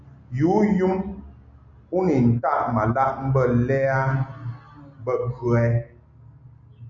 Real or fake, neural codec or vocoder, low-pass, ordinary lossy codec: real; none; 7.2 kHz; MP3, 96 kbps